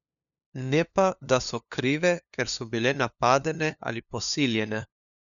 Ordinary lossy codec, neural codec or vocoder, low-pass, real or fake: none; codec, 16 kHz, 2 kbps, FunCodec, trained on LibriTTS, 25 frames a second; 7.2 kHz; fake